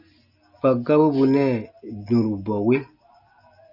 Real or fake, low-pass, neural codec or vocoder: real; 5.4 kHz; none